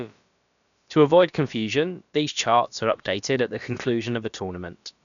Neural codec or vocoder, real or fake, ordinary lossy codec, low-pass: codec, 16 kHz, about 1 kbps, DyCAST, with the encoder's durations; fake; none; 7.2 kHz